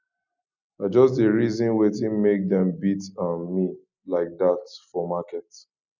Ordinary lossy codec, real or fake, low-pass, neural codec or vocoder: none; real; 7.2 kHz; none